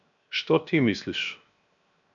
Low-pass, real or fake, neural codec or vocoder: 7.2 kHz; fake; codec, 16 kHz, 0.7 kbps, FocalCodec